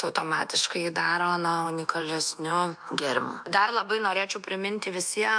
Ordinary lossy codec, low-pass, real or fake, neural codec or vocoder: MP3, 64 kbps; 9.9 kHz; fake; codec, 24 kHz, 1.2 kbps, DualCodec